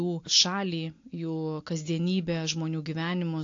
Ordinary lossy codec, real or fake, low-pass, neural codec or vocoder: AAC, 48 kbps; real; 7.2 kHz; none